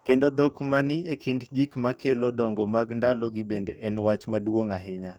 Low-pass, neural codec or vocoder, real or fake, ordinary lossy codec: none; codec, 44.1 kHz, 2.6 kbps, DAC; fake; none